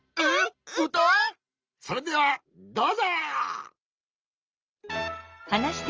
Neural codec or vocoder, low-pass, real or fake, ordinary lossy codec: none; 7.2 kHz; real; Opus, 24 kbps